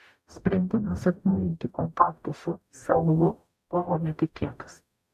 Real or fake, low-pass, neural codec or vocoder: fake; 14.4 kHz; codec, 44.1 kHz, 0.9 kbps, DAC